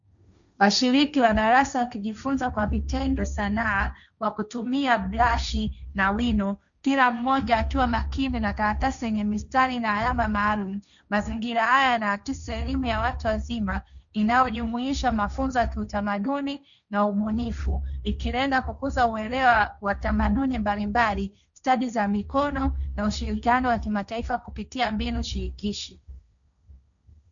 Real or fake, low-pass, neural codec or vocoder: fake; 7.2 kHz; codec, 16 kHz, 1.1 kbps, Voila-Tokenizer